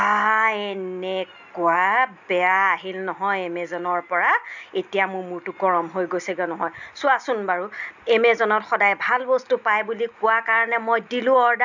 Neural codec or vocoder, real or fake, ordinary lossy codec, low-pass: none; real; none; 7.2 kHz